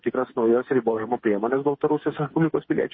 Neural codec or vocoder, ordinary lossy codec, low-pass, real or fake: codec, 16 kHz, 8 kbps, FreqCodec, smaller model; MP3, 24 kbps; 7.2 kHz; fake